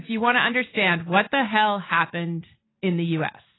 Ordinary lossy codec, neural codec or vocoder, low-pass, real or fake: AAC, 16 kbps; none; 7.2 kHz; real